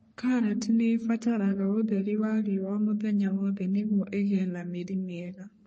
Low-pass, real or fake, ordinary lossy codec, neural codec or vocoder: 10.8 kHz; fake; MP3, 32 kbps; codec, 44.1 kHz, 3.4 kbps, Pupu-Codec